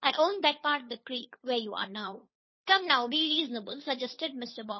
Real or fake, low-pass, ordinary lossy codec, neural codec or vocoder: fake; 7.2 kHz; MP3, 24 kbps; codec, 16 kHz, 2 kbps, FunCodec, trained on Chinese and English, 25 frames a second